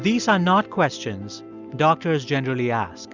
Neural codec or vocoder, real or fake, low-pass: none; real; 7.2 kHz